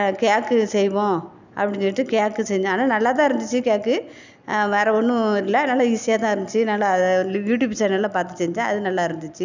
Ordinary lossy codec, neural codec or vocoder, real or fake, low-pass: none; none; real; 7.2 kHz